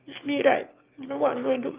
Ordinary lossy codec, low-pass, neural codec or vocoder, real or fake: Opus, 32 kbps; 3.6 kHz; autoencoder, 22.05 kHz, a latent of 192 numbers a frame, VITS, trained on one speaker; fake